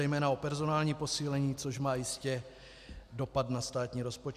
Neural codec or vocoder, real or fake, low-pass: none; real; 14.4 kHz